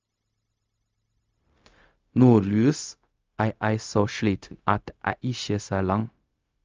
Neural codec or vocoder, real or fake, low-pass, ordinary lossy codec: codec, 16 kHz, 0.4 kbps, LongCat-Audio-Codec; fake; 7.2 kHz; Opus, 24 kbps